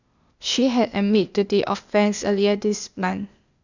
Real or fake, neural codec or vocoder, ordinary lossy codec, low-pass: fake; codec, 16 kHz, 0.8 kbps, ZipCodec; none; 7.2 kHz